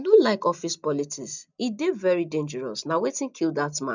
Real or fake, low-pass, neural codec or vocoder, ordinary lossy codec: real; 7.2 kHz; none; none